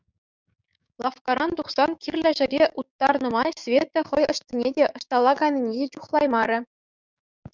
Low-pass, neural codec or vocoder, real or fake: 7.2 kHz; codec, 16 kHz, 6 kbps, DAC; fake